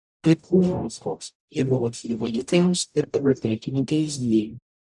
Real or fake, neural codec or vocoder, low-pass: fake; codec, 44.1 kHz, 0.9 kbps, DAC; 10.8 kHz